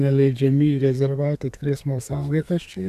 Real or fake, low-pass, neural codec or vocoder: fake; 14.4 kHz; codec, 32 kHz, 1.9 kbps, SNAC